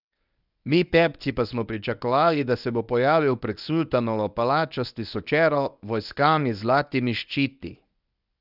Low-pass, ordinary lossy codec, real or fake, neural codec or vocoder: 5.4 kHz; none; fake; codec, 24 kHz, 0.9 kbps, WavTokenizer, medium speech release version 1